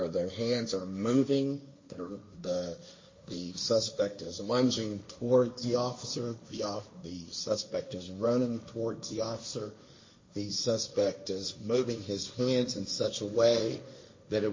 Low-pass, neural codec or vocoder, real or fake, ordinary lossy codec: 7.2 kHz; codec, 16 kHz, 1.1 kbps, Voila-Tokenizer; fake; MP3, 32 kbps